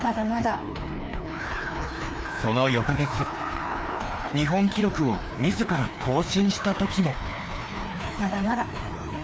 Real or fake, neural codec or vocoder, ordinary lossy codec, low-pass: fake; codec, 16 kHz, 2 kbps, FreqCodec, larger model; none; none